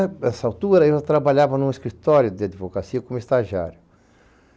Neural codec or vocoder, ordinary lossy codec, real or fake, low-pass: none; none; real; none